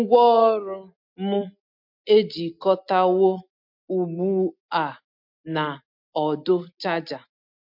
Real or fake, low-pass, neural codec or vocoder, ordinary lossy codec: real; 5.4 kHz; none; MP3, 48 kbps